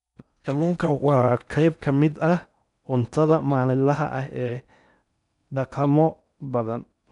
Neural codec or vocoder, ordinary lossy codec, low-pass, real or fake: codec, 16 kHz in and 24 kHz out, 0.6 kbps, FocalCodec, streaming, 4096 codes; none; 10.8 kHz; fake